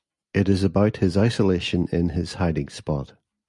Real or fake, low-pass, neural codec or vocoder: real; 10.8 kHz; none